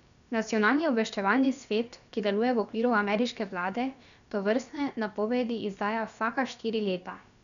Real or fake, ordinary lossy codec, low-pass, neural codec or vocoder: fake; none; 7.2 kHz; codec, 16 kHz, about 1 kbps, DyCAST, with the encoder's durations